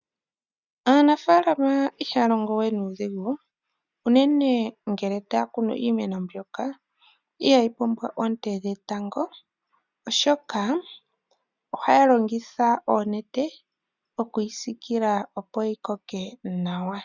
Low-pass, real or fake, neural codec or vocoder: 7.2 kHz; real; none